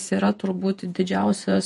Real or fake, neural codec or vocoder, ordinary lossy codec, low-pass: fake; vocoder, 48 kHz, 128 mel bands, Vocos; MP3, 48 kbps; 14.4 kHz